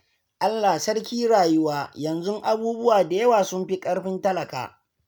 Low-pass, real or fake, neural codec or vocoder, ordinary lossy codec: none; real; none; none